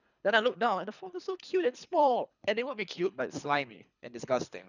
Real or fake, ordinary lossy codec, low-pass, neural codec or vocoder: fake; none; 7.2 kHz; codec, 24 kHz, 3 kbps, HILCodec